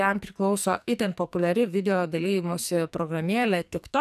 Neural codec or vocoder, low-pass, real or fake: codec, 44.1 kHz, 2.6 kbps, SNAC; 14.4 kHz; fake